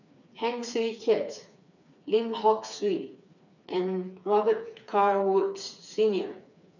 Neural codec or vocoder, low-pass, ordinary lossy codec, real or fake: codec, 16 kHz, 4 kbps, FreqCodec, smaller model; 7.2 kHz; none; fake